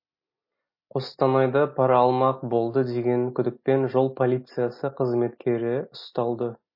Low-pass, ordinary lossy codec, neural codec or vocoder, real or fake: 5.4 kHz; MP3, 24 kbps; none; real